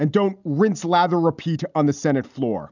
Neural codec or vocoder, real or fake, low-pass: none; real; 7.2 kHz